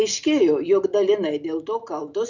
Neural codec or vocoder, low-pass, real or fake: none; 7.2 kHz; real